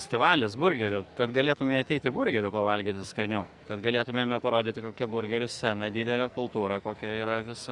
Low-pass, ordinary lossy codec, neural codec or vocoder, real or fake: 10.8 kHz; Opus, 64 kbps; codec, 44.1 kHz, 2.6 kbps, SNAC; fake